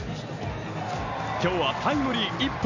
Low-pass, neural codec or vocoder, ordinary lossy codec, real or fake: 7.2 kHz; none; none; real